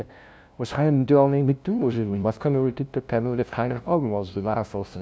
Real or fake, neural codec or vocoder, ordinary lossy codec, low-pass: fake; codec, 16 kHz, 0.5 kbps, FunCodec, trained on LibriTTS, 25 frames a second; none; none